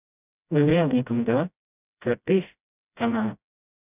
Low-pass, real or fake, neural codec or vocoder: 3.6 kHz; fake; codec, 16 kHz, 0.5 kbps, FreqCodec, smaller model